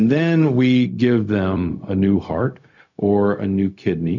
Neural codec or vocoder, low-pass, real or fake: codec, 16 kHz, 0.4 kbps, LongCat-Audio-Codec; 7.2 kHz; fake